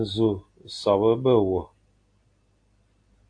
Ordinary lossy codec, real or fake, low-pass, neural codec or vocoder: AAC, 48 kbps; real; 9.9 kHz; none